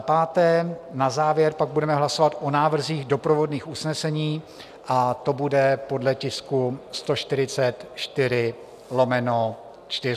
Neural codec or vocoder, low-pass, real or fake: none; 14.4 kHz; real